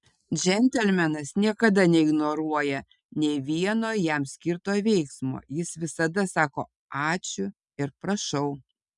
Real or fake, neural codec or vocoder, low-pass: real; none; 10.8 kHz